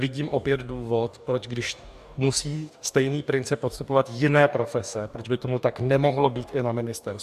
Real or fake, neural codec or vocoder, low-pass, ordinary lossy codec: fake; codec, 44.1 kHz, 2.6 kbps, DAC; 14.4 kHz; AAC, 96 kbps